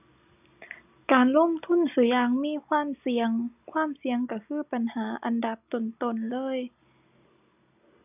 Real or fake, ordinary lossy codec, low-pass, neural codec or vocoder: real; none; 3.6 kHz; none